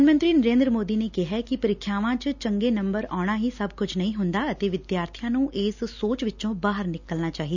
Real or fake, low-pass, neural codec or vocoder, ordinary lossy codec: real; 7.2 kHz; none; none